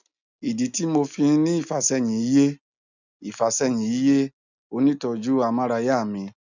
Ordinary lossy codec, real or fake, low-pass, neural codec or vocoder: none; real; 7.2 kHz; none